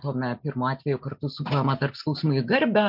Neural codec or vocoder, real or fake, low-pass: none; real; 5.4 kHz